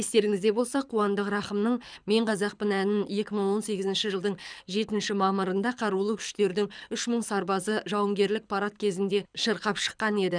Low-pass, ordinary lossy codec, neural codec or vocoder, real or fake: 9.9 kHz; none; codec, 24 kHz, 6 kbps, HILCodec; fake